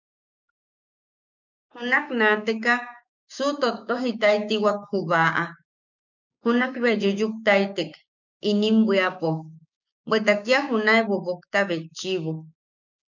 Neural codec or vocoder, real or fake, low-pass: codec, 16 kHz, 6 kbps, DAC; fake; 7.2 kHz